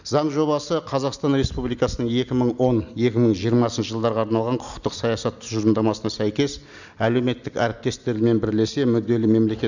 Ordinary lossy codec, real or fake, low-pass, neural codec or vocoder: none; real; 7.2 kHz; none